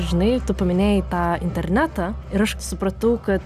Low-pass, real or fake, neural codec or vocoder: 14.4 kHz; real; none